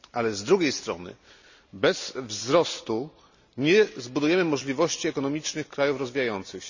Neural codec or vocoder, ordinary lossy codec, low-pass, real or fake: none; none; 7.2 kHz; real